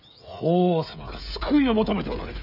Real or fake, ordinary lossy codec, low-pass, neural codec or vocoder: fake; none; 5.4 kHz; codec, 16 kHz, 16 kbps, FreqCodec, smaller model